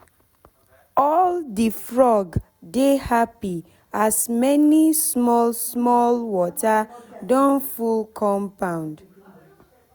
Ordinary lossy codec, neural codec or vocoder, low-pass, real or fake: none; none; none; real